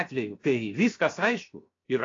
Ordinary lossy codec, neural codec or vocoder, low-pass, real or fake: AAC, 32 kbps; codec, 16 kHz, 0.7 kbps, FocalCodec; 7.2 kHz; fake